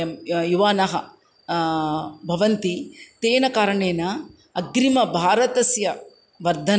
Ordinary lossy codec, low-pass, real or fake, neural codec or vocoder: none; none; real; none